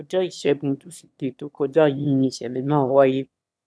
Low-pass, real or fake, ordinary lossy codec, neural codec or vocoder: none; fake; none; autoencoder, 22.05 kHz, a latent of 192 numbers a frame, VITS, trained on one speaker